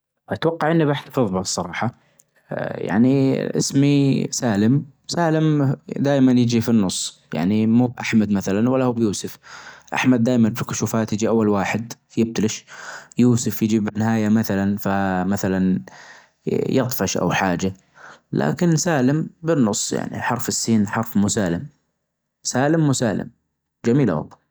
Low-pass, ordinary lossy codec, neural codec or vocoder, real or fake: none; none; none; real